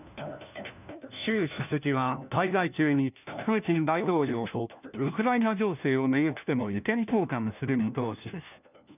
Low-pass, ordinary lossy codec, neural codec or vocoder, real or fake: 3.6 kHz; none; codec, 16 kHz, 1 kbps, FunCodec, trained on LibriTTS, 50 frames a second; fake